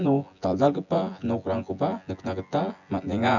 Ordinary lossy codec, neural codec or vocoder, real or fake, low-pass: none; vocoder, 24 kHz, 100 mel bands, Vocos; fake; 7.2 kHz